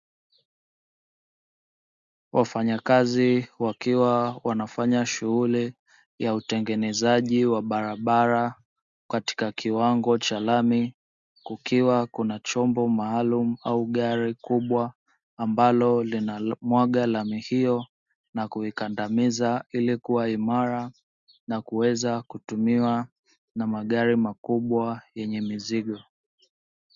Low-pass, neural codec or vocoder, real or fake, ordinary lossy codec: 7.2 kHz; none; real; Opus, 64 kbps